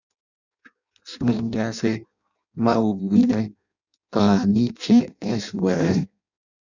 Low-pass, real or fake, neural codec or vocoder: 7.2 kHz; fake; codec, 16 kHz in and 24 kHz out, 0.6 kbps, FireRedTTS-2 codec